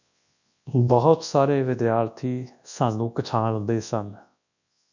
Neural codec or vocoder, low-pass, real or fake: codec, 24 kHz, 0.9 kbps, WavTokenizer, large speech release; 7.2 kHz; fake